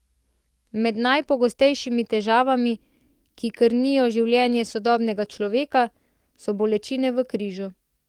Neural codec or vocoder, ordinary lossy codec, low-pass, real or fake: codec, 44.1 kHz, 7.8 kbps, DAC; Opus, 24 kbps; 19.8 kHz; fake